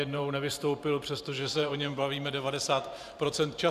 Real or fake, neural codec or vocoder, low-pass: fake; vocoder, 44.1 kHz, 128 mel bands every 512 samples, BigVGAN v2; 14.4 kHz